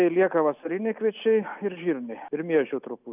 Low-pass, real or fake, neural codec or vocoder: 3.6 kHz; real; none